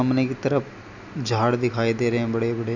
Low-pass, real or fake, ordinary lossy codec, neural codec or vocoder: 7.2 kHz; real; none; none